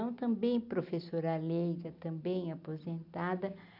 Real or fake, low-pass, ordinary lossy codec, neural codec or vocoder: real; 5.4 kHz; none; none